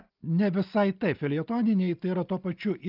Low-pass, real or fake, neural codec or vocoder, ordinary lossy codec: 5.4 kHz; real; none; Opus, 24 kbps